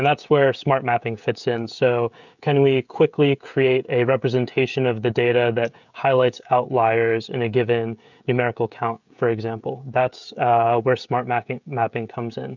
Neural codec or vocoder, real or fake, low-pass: codec, 16 kHz, 16 kbps, FreqCodec, smaller model; fake; 7.2 kHz